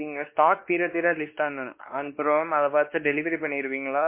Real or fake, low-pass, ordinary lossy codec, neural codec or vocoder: fake; 3.6 kHz; MP3, 24 kbps; codec, 16 kHz, 2 kbps, X-Codec, WavLM features, trained on Multilingual LibriSpeech